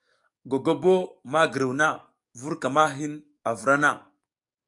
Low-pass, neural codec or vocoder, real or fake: 10.8 kHz; codec, 44.1 kHz, 7.8 kbps, DAC; fake